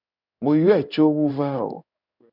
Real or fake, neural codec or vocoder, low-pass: fake; codec, 16 kHz in and 24 kHz out, 1 kbps, XY-Tokenizer; 5.4 kHz